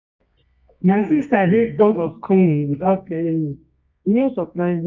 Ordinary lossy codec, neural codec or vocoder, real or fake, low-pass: none; codec, 24 kHz, 0.9 kbps, WavTokenizer, medium music audio release; fake; 7.2 kHz